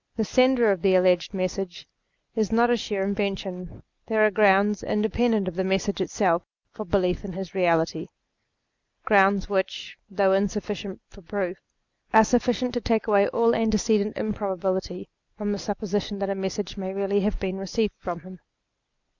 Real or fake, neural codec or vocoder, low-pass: real; none; 7.2 kHz